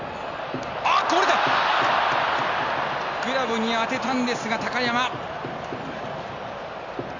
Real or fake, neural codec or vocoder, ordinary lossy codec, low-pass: real; none; Opus, 64 kbps; 7.2 kHz